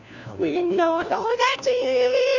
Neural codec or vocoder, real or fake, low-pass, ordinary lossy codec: codec, 16 kHz, 1 kbps, FunCodec, trained on LibriTTS, 50 frames a second; fake; 7.2 kHz; none